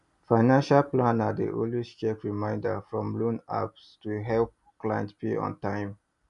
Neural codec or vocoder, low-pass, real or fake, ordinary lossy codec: none; 10.8 kHz; real; none